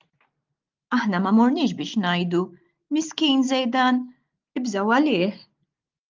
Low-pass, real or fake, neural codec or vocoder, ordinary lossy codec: 7.2 kHz; real; none; Opus, 24 kbps